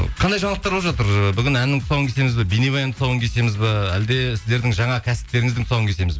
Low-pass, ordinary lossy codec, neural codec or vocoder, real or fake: none; none; none; real